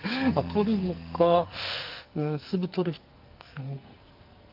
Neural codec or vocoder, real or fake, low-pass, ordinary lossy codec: codec, 44.1 kHz, 2.6 kbps, SNAC; fake; 5.4 kHz; Opus, 24 kbps